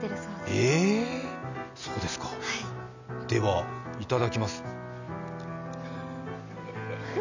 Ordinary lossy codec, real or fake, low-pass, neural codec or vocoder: none; real; 7.2 kHz; none